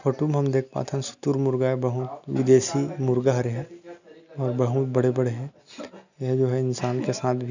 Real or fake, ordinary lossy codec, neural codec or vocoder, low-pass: real; none; none; 7.2 kHz